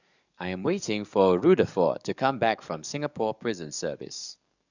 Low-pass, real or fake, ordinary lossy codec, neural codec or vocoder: 7.2 kHz; fake; none; codec, 44.1 kHz, 7.8 kbps, DAC